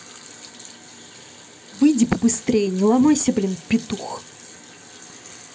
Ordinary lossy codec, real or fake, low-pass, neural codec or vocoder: none; real; none; none